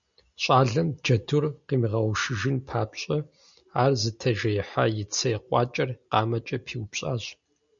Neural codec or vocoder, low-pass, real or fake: none; 7.2 kHz; real